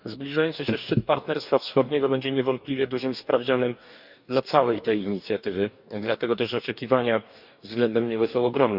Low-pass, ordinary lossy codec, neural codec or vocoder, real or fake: 5.4 kHz; none; codec, 44.1 kHz, 2.6 kbps, DAC; fake